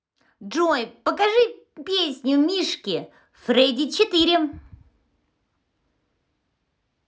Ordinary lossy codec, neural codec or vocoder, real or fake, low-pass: none; none; real; none